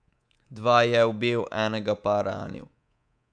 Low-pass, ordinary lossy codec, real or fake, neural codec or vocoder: 9.9 kHz; none; fake; codec, 24 kHz, 3.1 kbps, DualCodec